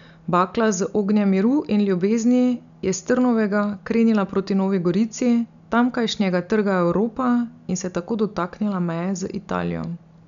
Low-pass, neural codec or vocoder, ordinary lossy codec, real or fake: 7.2 kHz; none; none; real